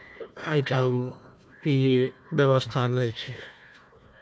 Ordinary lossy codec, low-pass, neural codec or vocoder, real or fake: none; none; codec, 16 kHz, 1 kbps, FunCodec, trained on Chinese and English, 50 frames a second; fake